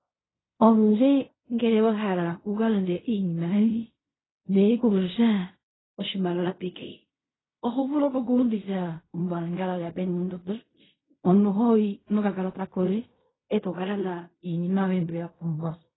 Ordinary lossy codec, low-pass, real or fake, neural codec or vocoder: AAC, 16 kbps; 7.2 kHz; fake; codec, 16 kHz in and 24 kHz out, 0.4 kbps, LongCat-Audio-Codec, fine tuned four codebook decoder